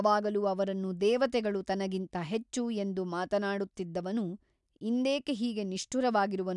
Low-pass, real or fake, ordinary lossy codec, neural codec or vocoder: 10.8 kHz; real; none; none